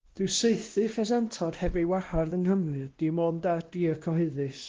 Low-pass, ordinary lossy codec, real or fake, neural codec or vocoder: 7.2 kHz; Opus, 16 kbps; fake; codec, 16 kHz, 1 kbps, X-Codec, WavLM features, trained on Multilingual LibriSpeech